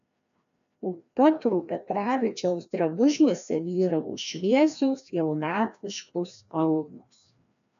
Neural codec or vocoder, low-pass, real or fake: codec, 16 kHz, 1 kbps, FreqCodec, larger model; 7.2 kHz; fake